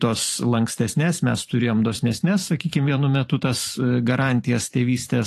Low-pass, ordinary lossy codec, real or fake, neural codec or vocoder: 14.4 kHz; AAC, 48 kbps; fake; vocoder, 44.1 kHz, 128 mel bands every 512 samples, BigVGAN v2